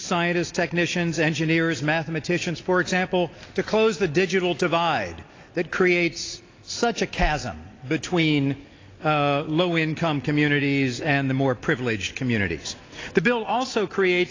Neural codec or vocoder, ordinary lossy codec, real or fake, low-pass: none; AAC, 32 kbps; real; 7.2 kHz